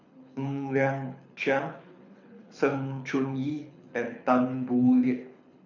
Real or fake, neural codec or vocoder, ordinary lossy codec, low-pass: fake; codec, 24 kHz, 6 kbps, HILCodec; none; 7.2 kHz